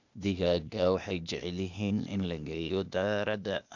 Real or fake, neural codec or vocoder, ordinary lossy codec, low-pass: fake; codec, 16 kHz, 0.8 kbps, ZipCodec; none; 7.2 kHz